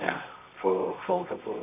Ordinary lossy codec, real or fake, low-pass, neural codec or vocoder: MP3, 32 kbps; fake; 3.6 kHz; codec, 24 kHz, 0.9 kbps, WavTokenizer, medium music audio release